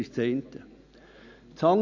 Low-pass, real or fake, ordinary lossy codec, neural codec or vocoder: 7.2 kHz; real; none; none